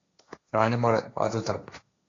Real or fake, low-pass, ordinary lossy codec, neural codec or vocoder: fake; 7.2 kHz; AAC, 32 kbps; codec, 16 kHz, 1.1 kbps, Voila-Tokenizer